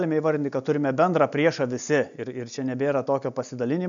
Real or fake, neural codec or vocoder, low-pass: real; none; 7.2 kHz